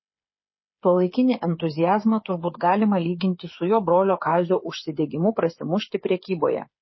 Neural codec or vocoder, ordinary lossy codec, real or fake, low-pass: codec, 16 kHz, 8 kbps, FreqCodec, smaller model; MP3, 24 kbps; fake; 7.2 kHz